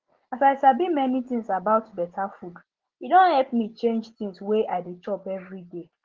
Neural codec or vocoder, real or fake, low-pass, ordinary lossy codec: none; real; 7.2 kHz; Opus, 16 kbps